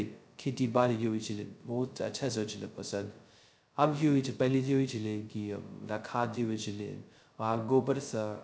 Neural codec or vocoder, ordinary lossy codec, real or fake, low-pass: codec, 16 kHz, 0.2 kbps, FocalCodec; none; fake; none